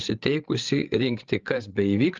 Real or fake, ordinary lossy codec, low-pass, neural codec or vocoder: fake; Opus, 32 kbps; 7.2 kHz; codec, 16 kHz, 8 kbps, FreqCodec, larger model